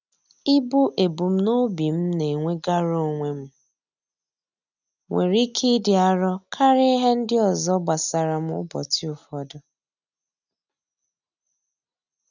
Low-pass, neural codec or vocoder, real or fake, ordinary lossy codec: 7.2 kHz; none; real; none